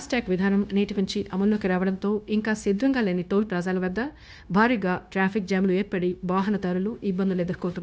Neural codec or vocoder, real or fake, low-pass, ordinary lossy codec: codec, 16 kHz, 0.9 kbps, LongCat-Audio-Codec; fake; none; none